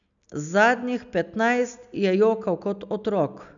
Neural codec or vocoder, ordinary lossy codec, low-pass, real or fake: none; none; 7.2 kHz; real